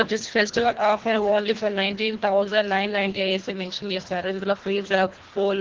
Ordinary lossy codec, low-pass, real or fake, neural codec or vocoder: Opus, 16 kbps; 7.2 kHz; fake; codec, 24 kHz, 1.5 kbps, HILCodec